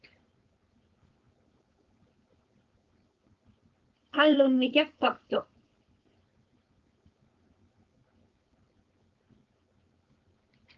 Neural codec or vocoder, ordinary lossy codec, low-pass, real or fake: codec, 16 kHz, 4.8 kbps, FACodec; Opus, 32 kbps; 7.2 kHz; fake